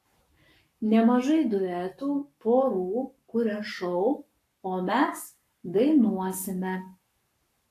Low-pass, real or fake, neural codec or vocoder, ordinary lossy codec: 14.4 kHz; fake; codec, 44.1 kHz, 7.8 kbps, Pupu-Codec; AAC, 64 kbps